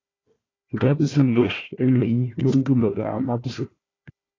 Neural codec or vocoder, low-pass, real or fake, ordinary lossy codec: codec, 16 kHz, 1 kbps, FunCodec, trained on Chinese and English, 50 frames a second; 7.2 kHz; fake; AAC, 32 kbps